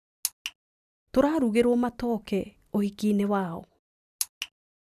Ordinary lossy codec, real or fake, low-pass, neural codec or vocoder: none; real; 14.4 kHz; none